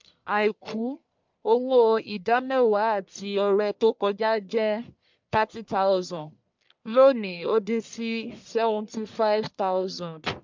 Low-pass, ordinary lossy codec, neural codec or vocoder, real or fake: 7.2 kHz; MP3, 64 kbps; codec, 44.1 kHz, 1.7 kbps, Pupu-Codec; fake